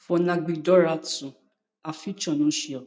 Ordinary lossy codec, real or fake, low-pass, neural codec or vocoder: none; real; none; none